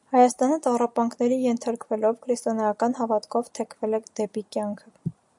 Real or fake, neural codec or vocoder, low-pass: real; none; 10.8 kHz